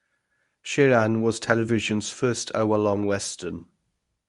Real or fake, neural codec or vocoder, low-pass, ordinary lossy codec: fake; codec, 24 kHz, 0.9 kbps, WavTokenizer, medium speech release version 1; 10.8 kHz; Opus, 64 kbps